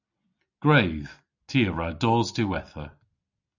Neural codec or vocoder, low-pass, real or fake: none; 7.2 kHz; real